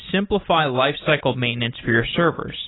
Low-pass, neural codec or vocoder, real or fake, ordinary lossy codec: 7.2 kHz; none; real; AAC, 16 kbps